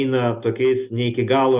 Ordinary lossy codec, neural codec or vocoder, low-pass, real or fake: Opus, 64 kbps; none; 3.6 kHz; real